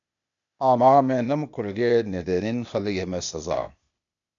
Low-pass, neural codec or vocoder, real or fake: 7.2 kHz; codec, 16 kHz, 0.8 kbps, ZipCodec; fake